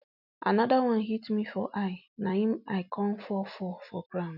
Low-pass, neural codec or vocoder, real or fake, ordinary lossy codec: 5.4 kHz; none; real; none